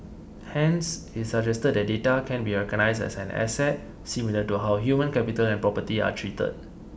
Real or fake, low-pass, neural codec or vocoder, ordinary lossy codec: real; none; none; none